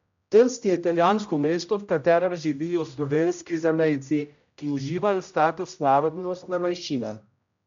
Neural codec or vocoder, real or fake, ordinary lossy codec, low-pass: codec, 16 kHz, 0.5 kbps, X-Codec, HuBERT features, trained on general audio; fake; MP3, 64 kbps; 7.2 kHz